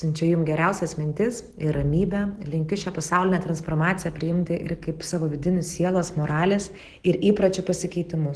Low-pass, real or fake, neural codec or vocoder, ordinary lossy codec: 10.8 kHz; real; none; Opus, 16 kbps